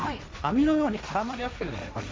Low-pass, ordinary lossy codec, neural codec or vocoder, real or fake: none; none; codec, 16 kHz, 1.1 kbps, Voila-Tokenizer; fake